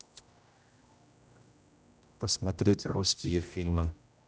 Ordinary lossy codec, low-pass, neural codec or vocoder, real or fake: none; none; codec, 16 kHz, 0.5 kbps, X-Codec, HuBERT features, trained on general audio; fake